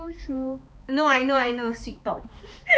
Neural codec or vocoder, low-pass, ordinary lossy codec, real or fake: codec, 16 kHz, 2 kbps, X-Codec, HuBERT features, trained on balanced general audio; none; none; fake